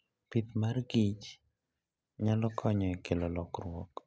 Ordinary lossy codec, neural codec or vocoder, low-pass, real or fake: none; none; none; real